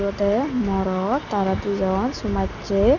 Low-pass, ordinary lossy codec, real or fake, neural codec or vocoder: 7.2 kHz; none; real; none